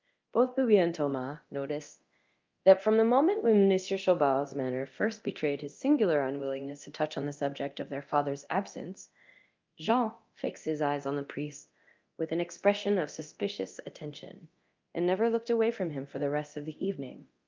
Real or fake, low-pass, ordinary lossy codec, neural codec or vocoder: fake; 7.2 kHz; Opus, 24 kbps; codec, 24 kHz, 0.9 kbps, DualCodec